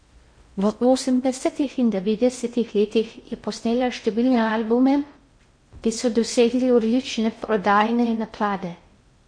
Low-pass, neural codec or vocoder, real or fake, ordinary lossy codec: 9.9 kHz; codec, 16 kHz in and 24 kHz out, 0.6 kbps, FocalCodec, streaming, 4096 codes; fake; MP3, 48 kbps